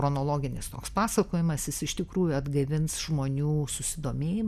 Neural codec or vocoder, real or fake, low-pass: none; real; 14.4 kHz